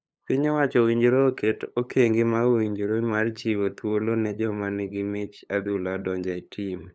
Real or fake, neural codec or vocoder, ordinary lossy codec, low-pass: fake; codec, 16 kHz, 8 kbps, FunCodec, trained on LibriTTS, 25 frames a second; none; none